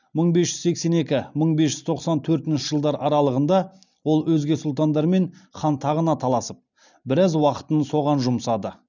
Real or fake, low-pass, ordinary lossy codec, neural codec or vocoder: real; 7.2 kHz; none; none